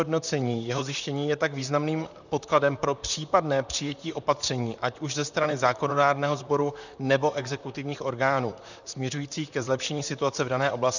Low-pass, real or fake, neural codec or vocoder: 7.2 kHz; fake; vocoder, 44.1 kHz, 128 mel bands, Pupu-Vocoder